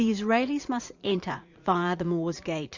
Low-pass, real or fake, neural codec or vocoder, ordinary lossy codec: 7.2 kHz; fake; vocoder, 44.1 kHz, 80 mel bands, Vocos; Opus, 64 kbps